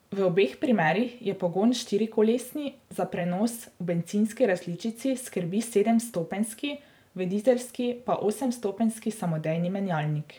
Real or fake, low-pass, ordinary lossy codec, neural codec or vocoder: fake; none; none; vocoder, 44.1 kHz, 128 mel bands every 512 samples, BigVGAN v2